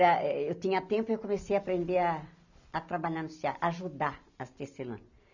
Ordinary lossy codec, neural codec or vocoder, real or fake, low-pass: none; none; real; 7.2 kHz